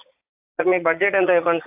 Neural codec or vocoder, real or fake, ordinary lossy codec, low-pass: vocoder, 44.1 kHz, 80 mel bands, Vocos; fake; AAC, 24 kbps; 3.6 kHz